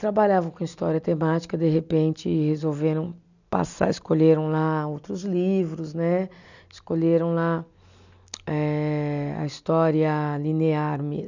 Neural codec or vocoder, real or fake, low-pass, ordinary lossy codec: none; real; 7.2 kHz; none